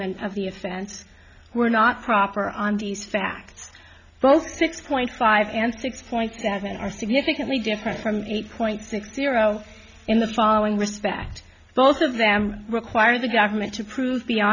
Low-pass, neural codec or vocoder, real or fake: 7.2 kHz; none; real